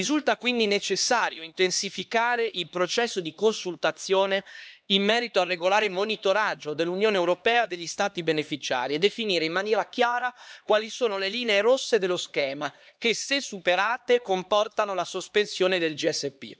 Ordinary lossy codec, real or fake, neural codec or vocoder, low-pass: none; fake; codec, 16 kHz, 2 kbps, X-Codec, HuBERT features, trained on LibriSpeech; none